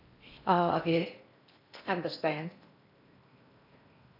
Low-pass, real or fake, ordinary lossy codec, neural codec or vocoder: 5.4 kHz; fake; none; codec, 16 kHz in and 24 kHz out, 0.6 kbps, FocalCodec, streaming, 4096 codes